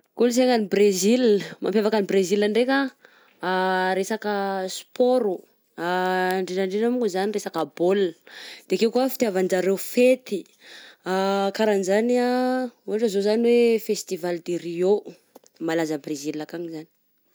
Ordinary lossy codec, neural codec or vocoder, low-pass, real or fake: none; none; none; real